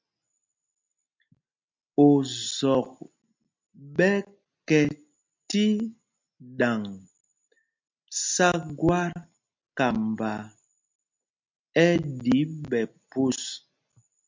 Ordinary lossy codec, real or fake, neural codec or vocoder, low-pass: MP3, 64 kbps; real; none; 7.2 kHz